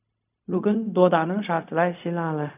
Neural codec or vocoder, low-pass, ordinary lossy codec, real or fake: codec, 16 kHz, 0.4 kbps, LongCat-Audio-Codec; 3.6 kHz; none; fake